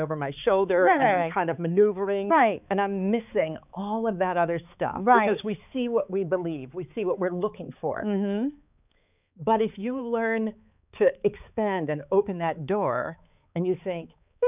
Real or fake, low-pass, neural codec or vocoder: fake; 3.6 kHz; codec, 16 kHz, 4 kbps, X-Codec, HuBERT features, trained on balanced general audio